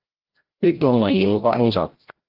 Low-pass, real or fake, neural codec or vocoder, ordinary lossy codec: 5.4 kHz; fake; codec, 16 kHz, 0.5 kbps, FreqCodec, larger model; Opus, 16 kbps